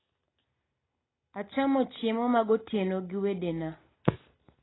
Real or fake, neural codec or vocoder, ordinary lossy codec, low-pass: real; none; AAC, 16 kbps; 7.2 kHz